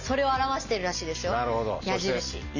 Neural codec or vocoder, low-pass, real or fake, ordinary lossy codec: none; 7.2 kHz; real; none